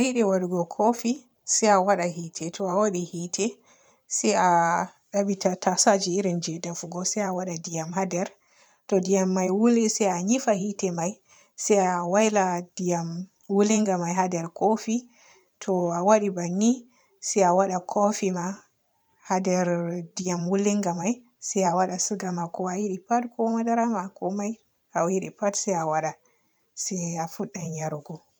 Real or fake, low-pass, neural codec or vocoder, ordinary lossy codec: fake; none; vocoder, 44.1 kHz, 128 mel bands every 512 samples, BigVGAN v2; none